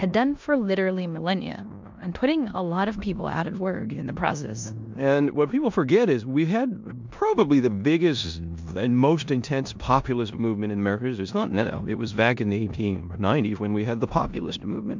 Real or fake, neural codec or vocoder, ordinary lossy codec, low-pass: fake; codec, 16 kHz in and 24 kHz out, 0.9 kbps, LongCat-Audio-Codec, four codebook decoder; MP3, 64 kbps; 7.2 kHz